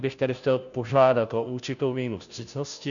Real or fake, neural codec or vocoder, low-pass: fake; codec, 16 kHz, 0.5 kbps, FunCodec, trained on Chinese and English, 25 frames a second; 7.2 kHz